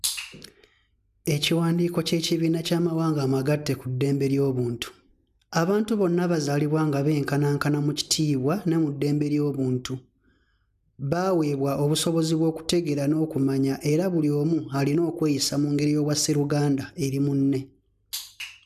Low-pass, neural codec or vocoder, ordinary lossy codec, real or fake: 14.4 kHz; none; none; real